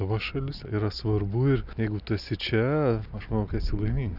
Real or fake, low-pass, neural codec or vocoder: real; 5.4 kHz; none